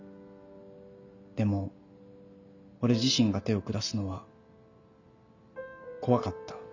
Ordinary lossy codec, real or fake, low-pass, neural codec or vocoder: none; real; 7.2 kHz; none